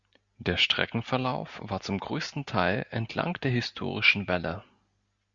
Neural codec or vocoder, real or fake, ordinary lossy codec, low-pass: none; real; Opus, 64 kbps; 7.2 kHz